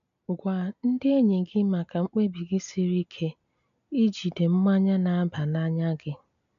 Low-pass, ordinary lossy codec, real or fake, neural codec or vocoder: 7.2 kHz; none; real; none